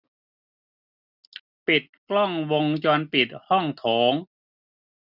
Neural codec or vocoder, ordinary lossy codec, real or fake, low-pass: none; none; real; 5.4 kHz